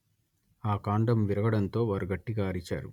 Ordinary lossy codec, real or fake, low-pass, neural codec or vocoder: none; real; 19.8 kHz; none